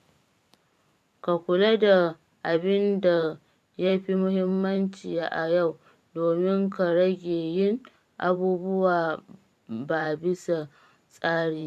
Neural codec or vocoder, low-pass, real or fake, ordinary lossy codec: vocoder, 44.1 kHz, 128 mel bands every 512 samples, BigVGAN v2; 14.4 kHz; fake; none